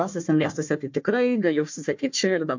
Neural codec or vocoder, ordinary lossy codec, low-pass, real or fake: codec, 16 kHz, 1 kbps, FunCodec, trained on Chinese and English, 50 frames a second; MP3, 48 kbps; 7.2 kHz; fake